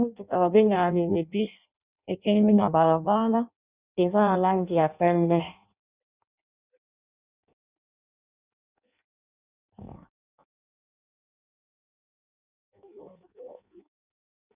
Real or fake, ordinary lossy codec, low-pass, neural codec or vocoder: fake; Opus, 64 kbps; 3.6 kHz; codec, 16 kHz in and 24 kHz out, 0.6 kbps, FireRedTTS-2 codec